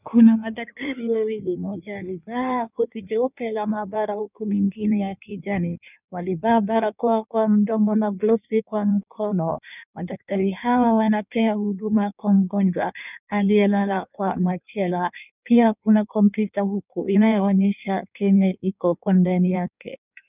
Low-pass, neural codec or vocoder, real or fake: 3.6 kHz; codec, 16 kHz in and 24 kHz out, 1.1 kbps, FireRedTTS-2 codec; fake